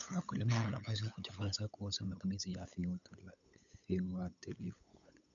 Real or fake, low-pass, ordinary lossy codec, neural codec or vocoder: fake; 7.2 kHz; none; codec, 16 kHz, 8 kbps, FunCodec, trained on LibriTTS, 25 frames a second